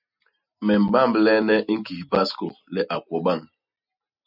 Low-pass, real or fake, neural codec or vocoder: 5.4 kHz; real; none